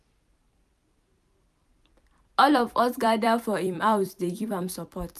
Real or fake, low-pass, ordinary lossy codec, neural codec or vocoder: fake; 14.4 kHz; none; vocoder, 44.1 kHz, 128 mel bands every 256 samples, BigVGAN v2